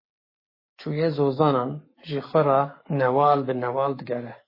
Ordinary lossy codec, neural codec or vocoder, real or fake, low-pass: MP3, 24 kbps; codec, 44.1 kHz, 7.8 kbps, Pupu-Codec; fake; 5.4 kHz